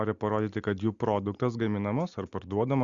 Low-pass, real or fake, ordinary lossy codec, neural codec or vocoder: 7.2 kHz; real; Opus, 64 kbps; none